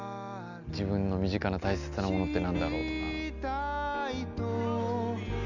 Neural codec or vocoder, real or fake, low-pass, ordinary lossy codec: none; real; 7.2 kHz; none